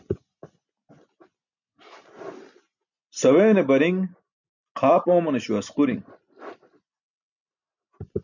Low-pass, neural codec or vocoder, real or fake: 7.2 kHz; none; real